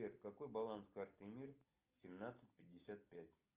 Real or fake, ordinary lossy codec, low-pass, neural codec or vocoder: real; Opus, 24 kbps; 3.6 kHz; none